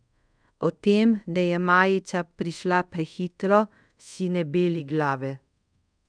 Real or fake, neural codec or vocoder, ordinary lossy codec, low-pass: fake; codec, 24 kHz, 0.5 kbps, DualCodec; none; 9.9 kHz